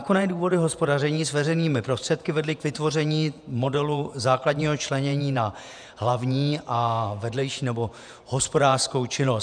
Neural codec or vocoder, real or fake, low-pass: vocoder, 48 kHz, 128 mel bands, Vocos; fake; 9.9 kHz